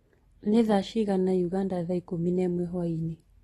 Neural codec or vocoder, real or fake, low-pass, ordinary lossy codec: none; real; 19.8 kHz; AAC, 32 kbps